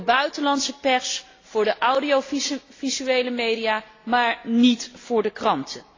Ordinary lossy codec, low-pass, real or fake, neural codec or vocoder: AAC, 32 kbps; 7.2 kHz; real; none